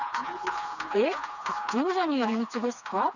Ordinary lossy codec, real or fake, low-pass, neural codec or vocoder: none; fake; 7.2 kHz; codec, 16 kHz, 2 kbps, FreqCodec, smaller model